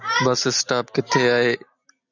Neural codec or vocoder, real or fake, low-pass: none; real; 7.2 kHz